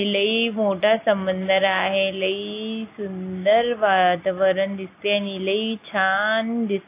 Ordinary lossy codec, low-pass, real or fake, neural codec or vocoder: AAC, 24 kbps; 3.6 kHz; real; none